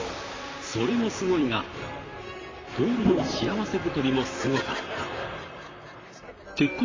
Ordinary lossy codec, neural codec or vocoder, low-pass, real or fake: AAC, 32 kbps; vocoder, 44.1 kHz, 128 mel bands, Pupu-Vocoder; 7.2 kHz; fake